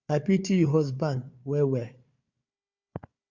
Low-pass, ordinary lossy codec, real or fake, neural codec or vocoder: 7.2 kHz; Opus, 64 kbps; fake; codec, 16 kHz, 4 kbps, FunCodec, trained on Chinese and English, 50 frames a second